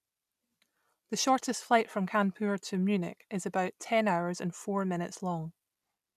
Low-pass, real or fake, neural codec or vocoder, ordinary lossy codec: 14.4 kHz; real; none; none